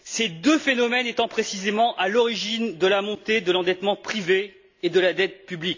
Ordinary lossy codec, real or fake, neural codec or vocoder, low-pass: AAC, 48 kbps; real; none; 7.2 kHz